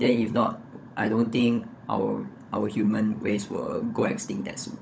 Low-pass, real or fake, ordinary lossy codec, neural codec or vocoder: none; fake; none; codec, 16 kHz, 16 kbps, FunCodec, trained on LibriTTS, 50 frames a second